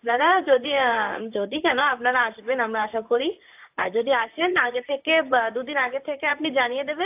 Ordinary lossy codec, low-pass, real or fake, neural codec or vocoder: AAC, 32 kbps; 3.6 kHz; fake; vocoder, 44.1 kHz, 128 mel bands, Pupu-Vocoder